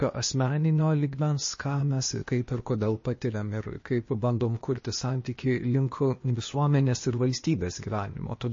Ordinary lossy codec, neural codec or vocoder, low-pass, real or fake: MP3, 32 kbps; codec, 16 kHz, 0.8 kbps, ZipCodec; 7.2 kHz; fake